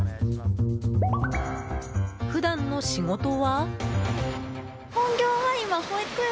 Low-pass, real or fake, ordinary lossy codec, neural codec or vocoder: none; real; none; none